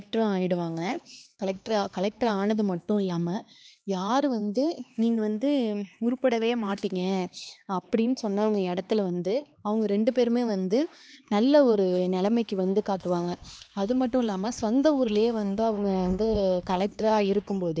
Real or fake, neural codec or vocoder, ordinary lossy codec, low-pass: fake; codec, 16 kHz, 2 kbps, X-Codec, HuBERT features, trained on LibriSpeech; none; none